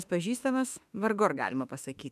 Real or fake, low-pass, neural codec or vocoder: fake; 14.4 kHz; autoencoder, 48 kHz, 32 numbers a frame, DAC-VAE, trained on Japanese speech